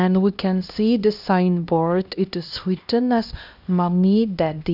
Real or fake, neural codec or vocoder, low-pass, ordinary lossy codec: fake; codec, 16 kHz, 1 kbps, X-Codec, HuBERT features, trained on LibriSpeech; 5.4 kHz; none